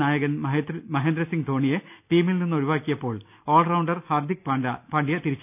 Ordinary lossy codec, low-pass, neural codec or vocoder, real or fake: none; 3.6 kHz; none; real